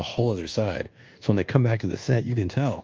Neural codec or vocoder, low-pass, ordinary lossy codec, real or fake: codec, 24 kHz, 1.2 kbps, DualCodec; 7.2 kHz; Opus, 24 kbps; fake